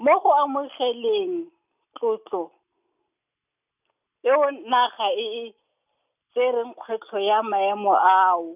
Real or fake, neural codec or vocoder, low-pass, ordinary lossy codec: real; none; 3.6 kHz; none